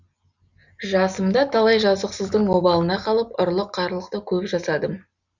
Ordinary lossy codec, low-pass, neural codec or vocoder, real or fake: Opus, 64 kbps; 7.2 kHz; none; real